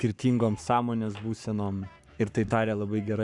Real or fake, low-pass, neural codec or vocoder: fake; 10.8 kHz; codec, 44.1 kHz, 7.8 kbps, Pupu-Codec